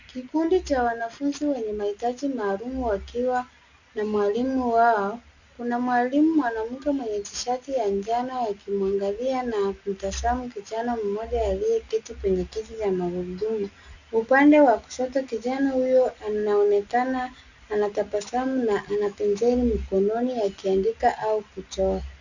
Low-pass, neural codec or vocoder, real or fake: 7.2 kHz; none; real